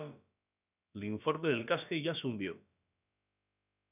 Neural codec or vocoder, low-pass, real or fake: codec, 16 kHz, about 1 kbps, DyCAST, with the encoder's durations; 3.6 kHz; fake